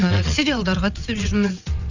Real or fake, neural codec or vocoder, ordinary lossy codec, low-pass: fake; vocoder, 22.05 kHz, 80 mel bands, WaveNeXt; Opus, 64 kbps; 7.2 kHz